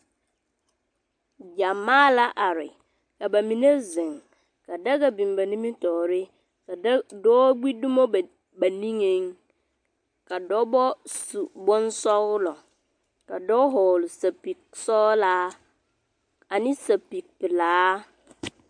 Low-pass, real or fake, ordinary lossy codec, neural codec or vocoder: 9.9 kHz; real; MP3, 64 kbps; none